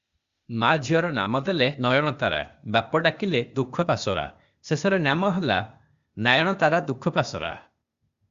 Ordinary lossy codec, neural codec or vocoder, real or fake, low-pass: Opus, 64 kbps; codec, 16 kHz, 0.8 kbps, ZipCodec; fake; 7.2 kHz